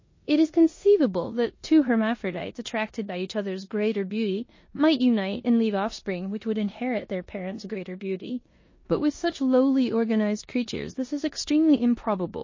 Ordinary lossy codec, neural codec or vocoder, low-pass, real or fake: MP3, 32 kbps; codec, 16 kHz in and 24 kHz out, 0.9 kbps, LongCat-Audio-Codec, four codebook decoder; 7.2 kHz; fake